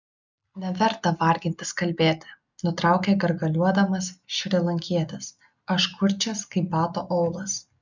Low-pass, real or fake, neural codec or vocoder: 7.2 kHz; real; none